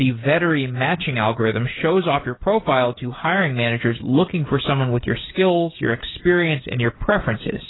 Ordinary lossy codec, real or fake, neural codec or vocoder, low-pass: AAC, 16 kbps; fake; codec, 16 kHz, 8 kbps, FreqCodec, smaller model; 7.2 kHz